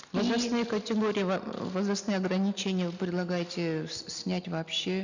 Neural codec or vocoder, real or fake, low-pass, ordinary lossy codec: none; real; 7.2 kHz; none